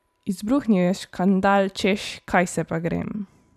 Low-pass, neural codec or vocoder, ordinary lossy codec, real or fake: 14.4 kHz; vocoder, 44.1 kHz, 128 mel bands every 512 samples, BigVGAN v2; none; fake